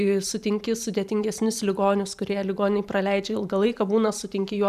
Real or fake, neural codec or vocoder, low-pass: fake; vocoder, 44.1 kHz, 128 mel bands every 512 samples, BigVGAN v2; 14.4 kHz